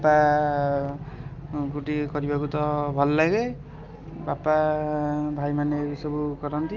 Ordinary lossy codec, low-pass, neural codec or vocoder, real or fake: Opus, 24 kbps; 7.2 kHz; none; real